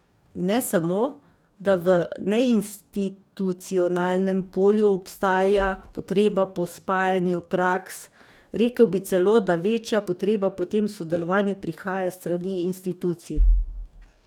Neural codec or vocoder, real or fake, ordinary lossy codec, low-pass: codec, 44.1 kHz, 2.6 kbps, DAC; fake; none; 19.8 kHz